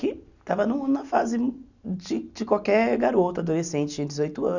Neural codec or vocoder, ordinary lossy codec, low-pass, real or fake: none; none; 7.2 kHz; real